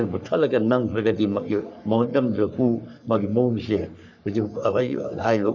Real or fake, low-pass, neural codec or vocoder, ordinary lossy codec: fake; 7.2 kHz; codec, 44.1 kHz, 3.4 kbps, Pupu-Codec; none